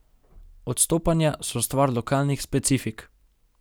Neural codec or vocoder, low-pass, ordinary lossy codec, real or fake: none; none; none; real